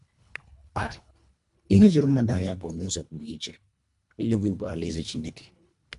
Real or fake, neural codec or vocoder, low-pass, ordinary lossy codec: fake; codec, 24 kHz, 1.5 kbps, HILCodec; 10.8 kHz; MP3, 64 kbps